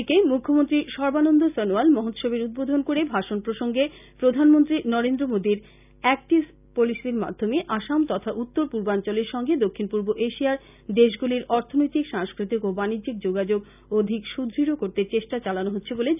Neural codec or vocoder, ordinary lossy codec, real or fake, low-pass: none; none; real; 3.6 kHz